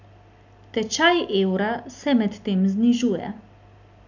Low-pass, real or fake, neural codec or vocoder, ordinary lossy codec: 7.2 kHz; real; none; none